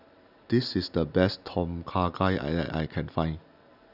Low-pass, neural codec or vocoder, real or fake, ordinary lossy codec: 5.4 kHz; none; real; none